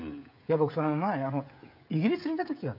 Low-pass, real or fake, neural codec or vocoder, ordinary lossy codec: 5.4 kHz; fake; codec, 16 kHz, 16 kbps, FreqCodec, smaller model; none